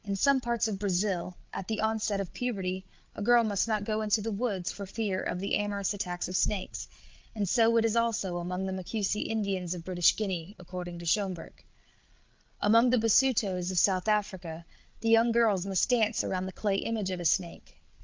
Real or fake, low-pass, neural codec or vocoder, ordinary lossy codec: fake; 7.2 kHz; codec, 16 kHz, 8 kbps, FreqCodec, larger model; Opus, 24 kbps